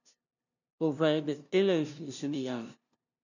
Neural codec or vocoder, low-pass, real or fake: codec, 16 kHz, 0.5 kbps, FunCodec, trained on LibriTTS, 25 frames a second; 7.2 kHz; fake